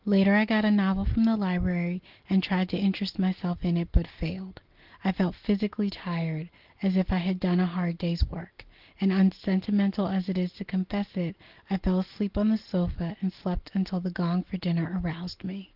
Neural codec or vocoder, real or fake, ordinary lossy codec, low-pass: none; real; Opus, 16 kbps; 5.4 kHz